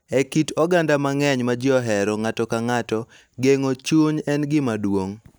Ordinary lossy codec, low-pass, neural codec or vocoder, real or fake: none; none; none; real